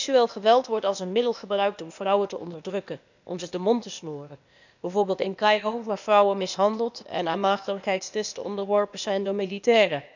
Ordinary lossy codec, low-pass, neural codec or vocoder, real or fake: none; 7.2 kHz; codec, 16 kHz, 0.8 kbps, ZipCodec; fake